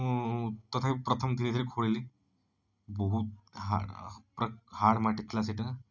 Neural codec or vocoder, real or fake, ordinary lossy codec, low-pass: none; real; none; 7.2 kHz